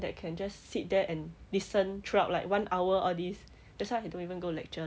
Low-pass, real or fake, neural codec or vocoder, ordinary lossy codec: none; real; none; none